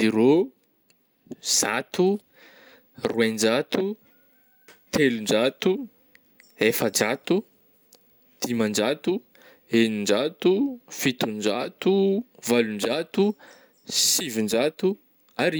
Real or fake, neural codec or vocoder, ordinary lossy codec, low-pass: real; none; none; none